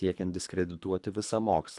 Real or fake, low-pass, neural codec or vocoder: fake; 10.8 kHz; codec, 24 kHz, 3 kbps, HILCodec